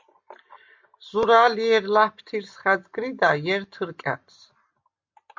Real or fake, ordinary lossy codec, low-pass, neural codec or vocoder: real; MP3, 48 kbps; 7.2 kHz; none